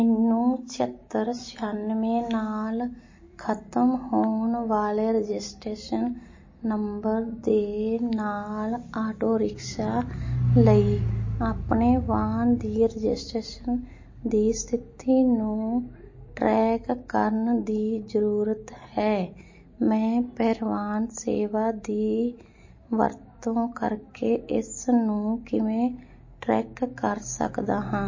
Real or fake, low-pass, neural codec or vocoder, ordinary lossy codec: real; 7.2 kHz; none; MP3, 32 kbps